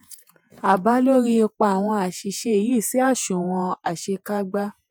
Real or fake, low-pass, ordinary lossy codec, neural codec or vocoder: fake; none; none; vocoder, 48 kHz, 128 mel bands, Vocos